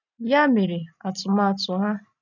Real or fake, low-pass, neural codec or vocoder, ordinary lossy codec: real; 7.2 kHz; none; none